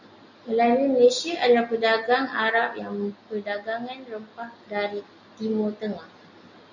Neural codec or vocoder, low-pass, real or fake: none; 7.2 kHz; real